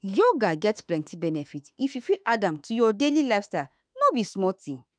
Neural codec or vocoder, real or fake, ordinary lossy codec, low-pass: autoencoder, 48 kHz, 32 numbers a frame, DAC-VAE, trained on Japanese speech; fake; none; 9.9 kHz